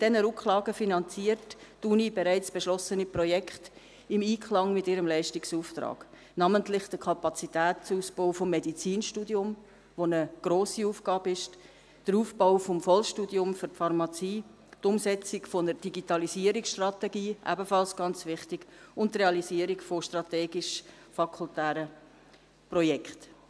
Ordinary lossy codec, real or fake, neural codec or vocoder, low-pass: none; real; none; none